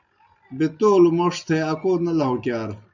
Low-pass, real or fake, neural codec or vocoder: 7.2 kHz; real; none